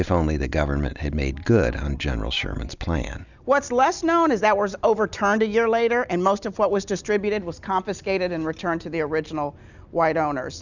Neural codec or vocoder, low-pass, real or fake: none; 7.2 kHz; real